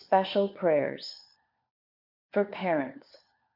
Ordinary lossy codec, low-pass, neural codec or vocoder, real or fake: MP3, 48 kbps; 5.4 kHz; codec, 16 kHz, 4 kbps, FunCodec, trained on LibriTTS, 50 frames a second; fake